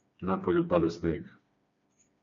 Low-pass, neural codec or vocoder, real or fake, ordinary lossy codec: 7.2 kHz; codec, 16 kHz, 2 kbps, FreqCodec, smaller model; fake; MP3, 48 kbps